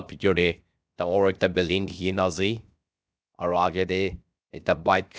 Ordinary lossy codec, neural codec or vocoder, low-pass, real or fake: none; codec, 16 kHz, 0.7 kbps, FocalCodec; none; fake